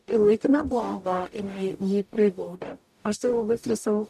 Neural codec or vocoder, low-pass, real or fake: codec, 44.1 kHz, 0.9 kbps, DAC; 14.4 kHz; fake